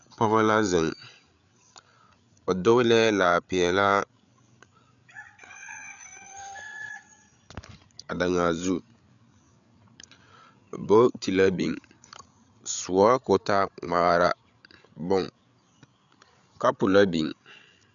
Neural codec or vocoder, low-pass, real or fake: codec, 16 kHz, 8 kbps, FreqCodec, larger model; 7.2 kHz; fake